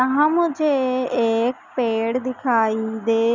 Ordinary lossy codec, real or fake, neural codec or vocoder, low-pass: none; real; none; 7.2 kHz